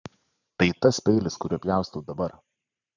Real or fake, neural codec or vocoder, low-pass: fake; vocoder, 44.1 kHz, 128 mel bands every 512 samples, BigVGAN v2; 7.2 kHz